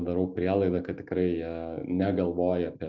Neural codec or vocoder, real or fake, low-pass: none; real; 7.2 kHz